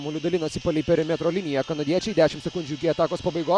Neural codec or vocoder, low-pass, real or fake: none; 9.9 kHz; real